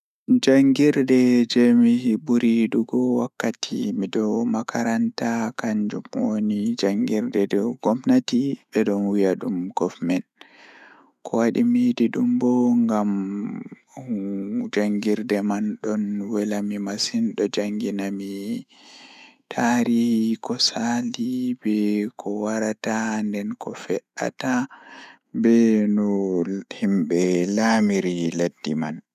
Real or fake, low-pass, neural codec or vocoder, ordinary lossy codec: fake; 14.4 kHz; autoencoder, 48 kHz, 128 numbers a frame, DAC-VAE, trained on Japanese speech; none